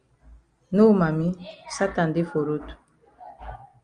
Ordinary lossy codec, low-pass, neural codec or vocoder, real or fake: Opus, 32 kbps; 9.9 kHz; none; real